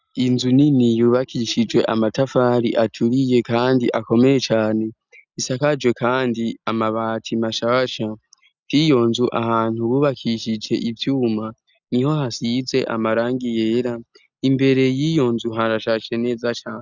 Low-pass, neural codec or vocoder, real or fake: 7.2 kHz; none; real